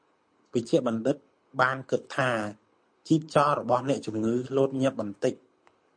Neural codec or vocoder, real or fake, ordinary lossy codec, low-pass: codec, 24 kHz, 6 kbps, HILCodec; fake; MP3, 48 kbps; 9.9 kHz